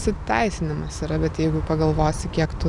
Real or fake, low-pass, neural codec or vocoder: real; 10.8 kHz; none